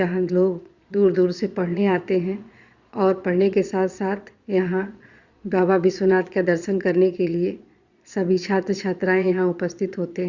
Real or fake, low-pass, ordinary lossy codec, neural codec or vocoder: fake; 7.2 kHz; Opus, 64 kbps; vocoder, 22.05 kHz, 80 mel bands, Vocos